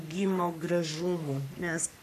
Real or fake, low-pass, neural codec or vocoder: fake; 14.4 kHz; codec, 44.1 kHz, 3.4 kbps, Pupu-Codec